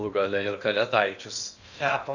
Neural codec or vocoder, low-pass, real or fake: codec, 16 kHz in and 24 kHz out, 0.8 kbps, FocalCodec, streaming, 65536 codes; 7.2 kHz; fake